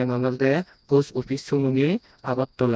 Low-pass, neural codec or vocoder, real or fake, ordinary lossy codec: none; codec, 16 kHz, 1 kbps, FreqCodec, smaller model; fake; none